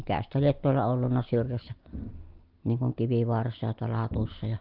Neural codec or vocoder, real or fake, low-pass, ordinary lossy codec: none; real; 5.4 kHz; Opus, 32 kbps